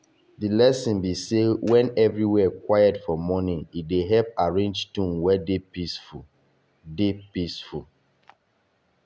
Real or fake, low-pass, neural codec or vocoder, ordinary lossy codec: real; none; none; none